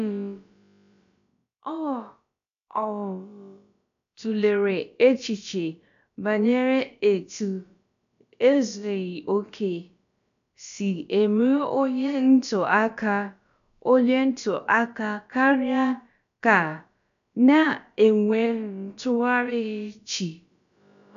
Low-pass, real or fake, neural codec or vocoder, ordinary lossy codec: 7.2 kHz; fake; codec, 16 kHz, about 1 kbps, DyCAST, with the encoder's durations; none